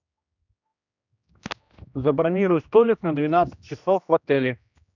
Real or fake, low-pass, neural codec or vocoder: fake; 7.2 kHz; codec, 16 kHz, 1 kbps, X-Codec, HuBERT features, trained on general audio